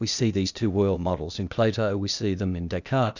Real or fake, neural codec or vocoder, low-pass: fake; codec, 16 kHz, 0.8 kbps, ZipCodec; 7.2 kHz